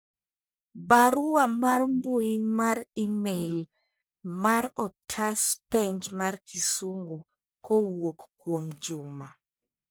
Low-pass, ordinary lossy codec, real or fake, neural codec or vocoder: none; none; fake; codec, 44.1 kHz, 1.7 kbps, Pupu-Codec